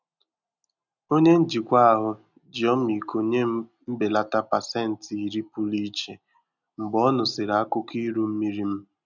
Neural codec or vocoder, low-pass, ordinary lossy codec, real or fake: none; 7.2 kHz; none; real